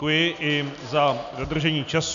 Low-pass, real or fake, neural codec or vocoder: 7.2 kHz; real; none